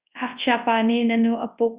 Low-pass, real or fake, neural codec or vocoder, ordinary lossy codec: 3.6 kHz; fake; codec, 24 kHz, 0.9 kbps, WavTokenizer, large speech release; Opus, 64 kbps